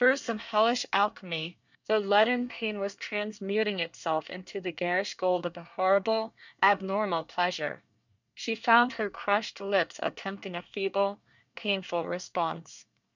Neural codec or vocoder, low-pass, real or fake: codec, 24 kHz, 1 kbps, SNAC; 7.2 kHz; fake